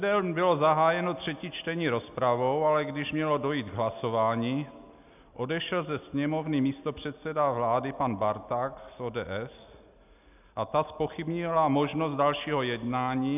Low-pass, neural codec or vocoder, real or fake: 3.6 kHz; none; real